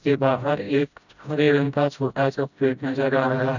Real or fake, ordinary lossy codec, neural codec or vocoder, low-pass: fake; none; codec, 16 kHz, 0.5 kbps, FreqCodec, smaller model; 7.2 kHz